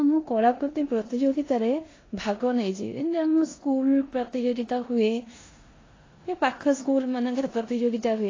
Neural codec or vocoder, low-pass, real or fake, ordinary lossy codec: codec, 16 kHz in and 24 kHz out, 0.9 kbps, LongCat-Audio-Codec, four codebook decoder; 7.2 kHz; fake; AAC, 32 kbps